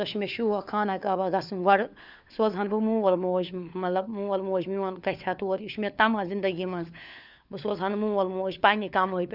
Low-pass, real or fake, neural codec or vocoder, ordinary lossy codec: 5.4 kHz; fake; codec, 16 kHz, 4 kbps, FunCodec, trained on LibriTTS, 50 frames a second; none